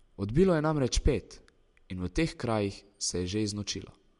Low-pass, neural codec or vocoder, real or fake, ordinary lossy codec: 10.8 kHz; none; real; MP3, 64 kbps